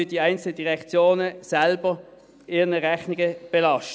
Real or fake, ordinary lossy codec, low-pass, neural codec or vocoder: real; none; none; none